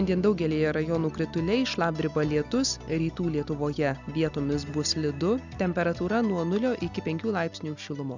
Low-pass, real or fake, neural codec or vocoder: 7.2 kHz; real; none